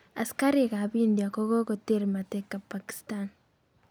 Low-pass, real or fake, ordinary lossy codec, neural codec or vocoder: none; real; none; none